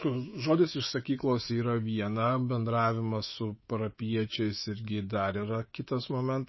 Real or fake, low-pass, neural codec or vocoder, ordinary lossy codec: fake; 7.2 kHz; codec, 24 kHz, 3.1 kbps, DualCodec; MP3, 24 kbps